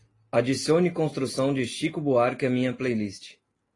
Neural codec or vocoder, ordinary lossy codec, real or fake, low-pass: none; AAC, 32 kbps; real; 10.8 kHz